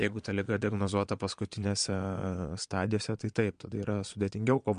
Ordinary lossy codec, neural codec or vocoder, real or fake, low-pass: MP3, 64 kbps; vocoder, 22.05 kHz, 80 mel bands, Vocos; fake; 9.9 kHz